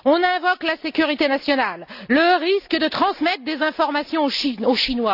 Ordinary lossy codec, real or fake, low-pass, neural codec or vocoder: none; real; 5.4 kHz; none